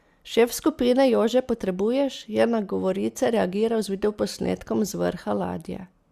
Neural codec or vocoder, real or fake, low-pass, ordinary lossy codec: none; real; 14.4 kHz; Opus, 64 kbps